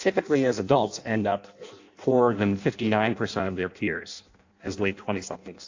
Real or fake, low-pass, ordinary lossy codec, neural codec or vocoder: fake; 7.2 kHz; AAC, 48 kbps; codec, 16 kHz in and 24 kHz out, 0.6 kbps, FireRedTTS-2 codec